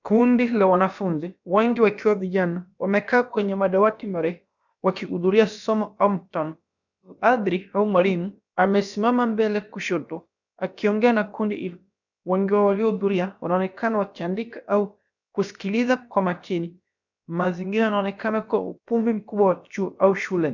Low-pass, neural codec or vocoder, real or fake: 7.2 kHz; codec, 16 kHz, about 1 kbps, DyCAST, with the encoder's durations; fake